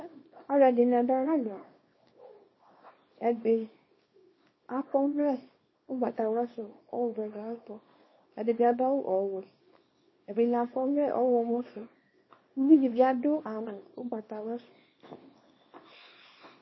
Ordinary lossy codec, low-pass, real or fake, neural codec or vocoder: MP3, 24 kbps; 7.2 kHz; fake; codec, 24 kHz, 0.9 kbps, WavTokenizer, small release